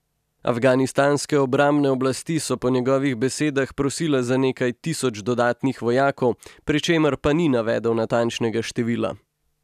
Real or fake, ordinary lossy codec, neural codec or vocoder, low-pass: real; none; none; 14.4 kHz